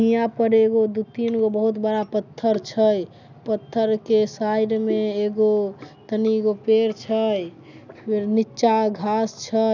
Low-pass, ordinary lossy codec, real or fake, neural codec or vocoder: 7.2 kHz; none; real; none